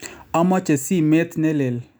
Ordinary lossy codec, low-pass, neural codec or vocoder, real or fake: none; none; none; real